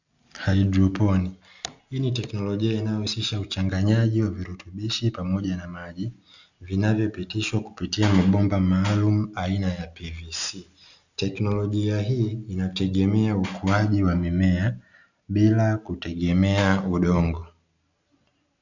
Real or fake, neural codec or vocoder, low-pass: real; none; 7.2 kHz